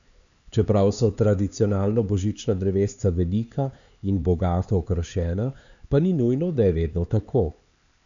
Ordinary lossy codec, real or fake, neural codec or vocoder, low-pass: none; fake; codec, 16 kHz, 4 kbps, X-Codec, HuBERT features, trained on LibriSpeech; 7.2 kHz